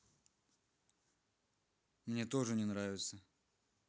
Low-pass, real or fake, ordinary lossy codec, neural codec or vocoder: none; real; none; none